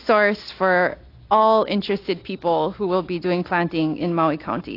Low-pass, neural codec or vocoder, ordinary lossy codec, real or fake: 5.4 kHz; none; AAC, 32 kbps; real